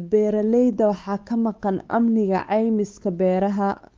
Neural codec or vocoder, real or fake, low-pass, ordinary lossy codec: codec, 16 kHz, 4 kbps, X-Codec, WavLM features, trained on Multilingual LibriSpeech; fake; 7.2 kHz; Opus, 32 kbps